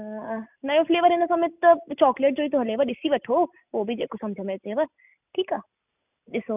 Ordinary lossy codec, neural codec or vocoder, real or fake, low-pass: none; none; real; 3.6 kHz